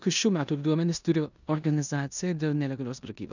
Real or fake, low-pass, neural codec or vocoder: fake; 7.2 kHz; codec, 16 kHz in and 24 kHz out, 0.9 kbps, LongCat-Audio-Codec, four codebook decoder